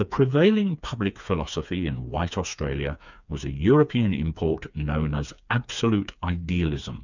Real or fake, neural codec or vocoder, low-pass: fake; codec, 16 kHz, 4 kbps, FreqCodec, smaller model; 7.2 kHz